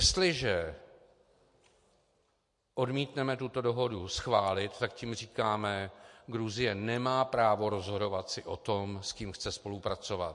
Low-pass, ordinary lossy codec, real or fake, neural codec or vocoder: 10.8 kHz; MP3, 48 kbps; real; none